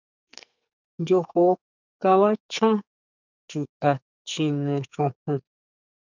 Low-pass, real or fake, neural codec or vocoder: 7.2 kHz; fake; codec, 44.1 kHz, 2.6 kbps, SNAC